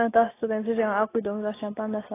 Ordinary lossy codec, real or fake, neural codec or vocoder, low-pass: AAC, 16 kbps; real; none; 3.6 kHz